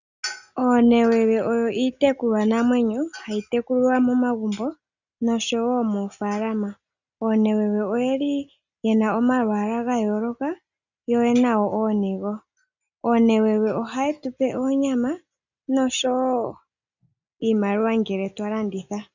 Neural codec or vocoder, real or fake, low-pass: none; real; 7.2 kHz